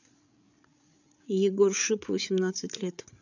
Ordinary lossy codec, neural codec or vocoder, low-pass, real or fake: none; codec, 16 kHz, 8 kbps, FreqCodec, larger model; 7.2 kHz; fake